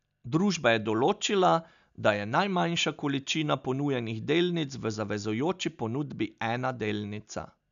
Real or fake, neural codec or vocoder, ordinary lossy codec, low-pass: real; none; none; 7.2 kHz